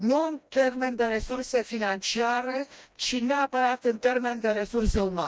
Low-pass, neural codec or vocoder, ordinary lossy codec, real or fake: none; codec, 16 kHz, 1 kbps, FreqCodec, smaller model; none; fake